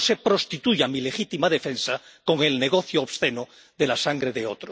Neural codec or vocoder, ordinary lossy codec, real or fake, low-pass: none; none; real; none